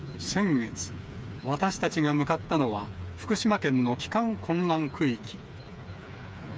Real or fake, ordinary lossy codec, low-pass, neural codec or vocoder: fake; none; none; codec, 16 kHz, 4 kbps, FreqCodec, smaller model